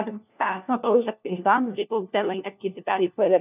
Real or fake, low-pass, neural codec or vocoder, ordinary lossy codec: fake; 3.6 kHz; codec, 16 kHz, 1 kbps, FunCodec, trained on LibriTTS, 50 frames a second; none